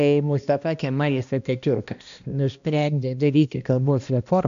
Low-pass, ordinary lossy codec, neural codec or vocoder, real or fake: 7.2 kHz; AAC, 96 kbps; codec, 16 kHz, 1 kbps, X-Codec, HuBERT features, trained on balanced general audio; fake